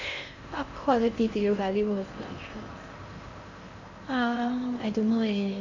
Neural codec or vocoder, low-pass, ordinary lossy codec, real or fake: codec, 16 kHz in and 24 kHz out, 0.8 kbps, FocalCodec, streaming, 65536 codes; 7.2 kHz; none; fake